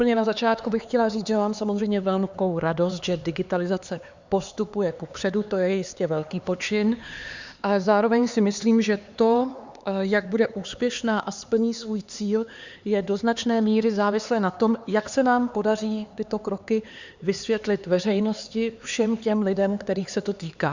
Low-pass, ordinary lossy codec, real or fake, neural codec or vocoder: 7.2 kHz; Opus, 64 kbps; fake; codec, 16 kHz, 4 kbps, X-Codec, HuBERT features, trained on LibriSpeech